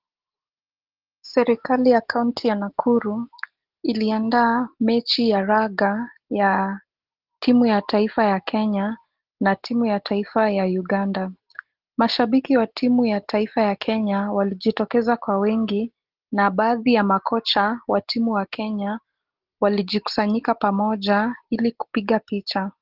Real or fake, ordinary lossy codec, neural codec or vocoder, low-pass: real; Opus, 16 kbps; none; 5.4 kHz